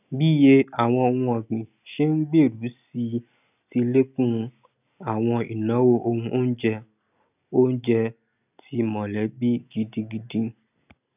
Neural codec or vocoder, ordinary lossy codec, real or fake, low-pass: none; none; real; 3.6 kHz